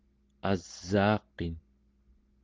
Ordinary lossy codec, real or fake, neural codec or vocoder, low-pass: Opus, 16 kbps; real; none; 7.2 kHz